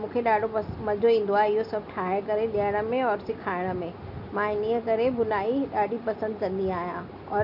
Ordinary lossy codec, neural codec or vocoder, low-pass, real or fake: none; none; 5.4 kHz; real